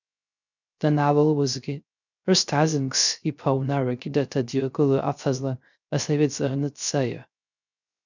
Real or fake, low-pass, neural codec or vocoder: fake; 7.2 kHz; codec, 16 kHz, 0.3 kbps, FocalCodec